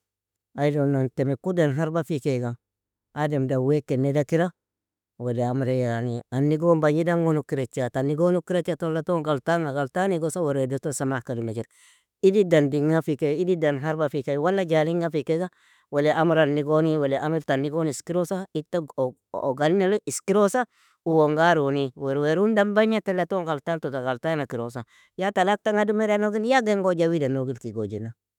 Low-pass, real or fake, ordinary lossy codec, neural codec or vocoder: 19.8 kHz; fake; none; autoencoder, 48 kHz, 32 numbers a frame, DAC-VAE, trained on Japanese speech